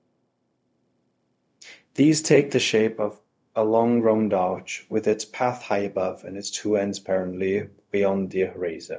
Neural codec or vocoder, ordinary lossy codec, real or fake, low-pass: codec, 16 kHz, 0.4 kbps, LongCat-Audio-Codec; none; fake; none